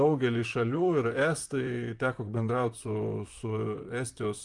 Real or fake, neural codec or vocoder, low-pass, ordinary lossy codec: fake; vocoder, 44.1 kHz, 128 mel bands, Pupu-Vocoder; 10.8 kHz; Opus, 32 kbps